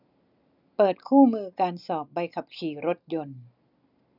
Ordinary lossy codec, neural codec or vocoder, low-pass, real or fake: none; none; 5.4 kHz; real